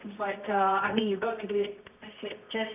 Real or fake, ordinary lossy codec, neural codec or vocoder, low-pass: fake; none; codec, 24 kHz, 0.9 kbps, WavTokenizer, medium music audio release; 3.6 kHz